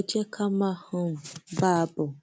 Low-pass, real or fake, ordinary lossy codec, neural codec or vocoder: none; real; none; none